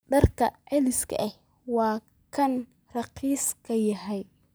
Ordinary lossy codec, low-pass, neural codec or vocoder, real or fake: none; none; none; real